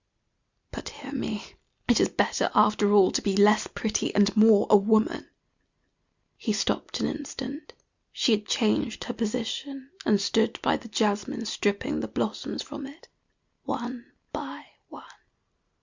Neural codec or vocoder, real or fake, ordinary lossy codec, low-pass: none; real; Opus, 64 kbps; 7.2 kHz